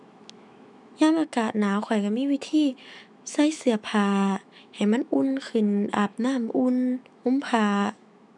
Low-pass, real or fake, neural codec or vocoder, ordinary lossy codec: 10.8 kHz; fake; autoencoder, 48 kHz, 128 numbers a frame, DAC-VAE, trained on Japanese speech; none